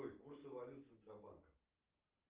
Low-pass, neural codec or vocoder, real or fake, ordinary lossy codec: 3.6 kHz; none; real; Opus, 32 kbps